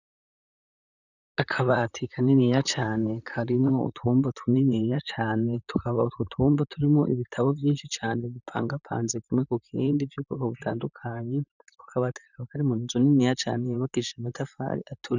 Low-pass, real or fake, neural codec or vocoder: 7.2 kHz; fake; vocoder, 44.1 kHz, 128 mel bands, Pupu-Vocoder